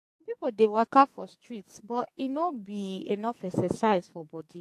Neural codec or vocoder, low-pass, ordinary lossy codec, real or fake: codec, 44.1 kHz, 2.6 kbps, SNAC; 14.4 kHz; AAC, 64 kbps; fake